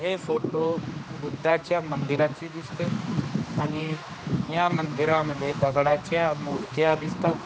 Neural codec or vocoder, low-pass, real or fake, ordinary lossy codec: codec, 16 kHz, 2 kbps, X-Codec, HuBERT features, trained on general audio; none; fake; none